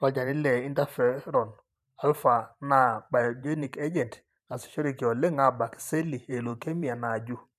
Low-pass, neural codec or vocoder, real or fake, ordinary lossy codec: 14.4 kHz; vocoder, 44.1 kHz, 128 mel bands every 512 samples, BigVGAN v2; fake; none